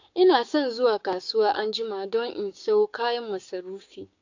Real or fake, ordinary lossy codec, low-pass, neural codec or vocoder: fake; AAC, 48 kbps; 7.2 kHz; codec, 16 kHz, 6 kbps, DAC